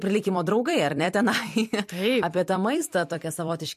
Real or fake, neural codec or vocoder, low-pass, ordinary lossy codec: fake; vocoder, 44.1 kHz, 128 mel bands every 256 samples, BigVGAN v2; 14.4 kHz; MP3, 64 kbps